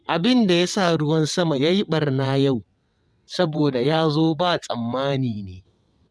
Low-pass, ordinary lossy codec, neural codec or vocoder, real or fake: none; none; vocoder, 22.05 kHz, 80 mel bands, WaveNeXt; fake